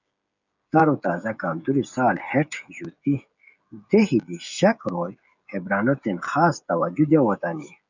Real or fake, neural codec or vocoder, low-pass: fake; codec, 16 kHz, 16 kbps, FreqCodec, smaller model; 7.2 kHz